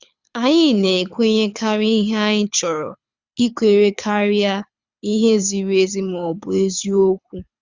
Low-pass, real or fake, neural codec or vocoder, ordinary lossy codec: 7.2 kHz; fake; codec, 24 kHz, 6 kbps, HILCodec; Opus, 64 kbps